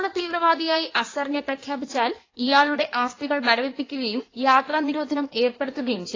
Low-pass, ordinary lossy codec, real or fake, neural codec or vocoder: 7.2 kHz; AAC, 32 kbps; fake; codec, 16 kHz in and 24 kHz out, 1.1 kbps, FireRedTTS-2 codec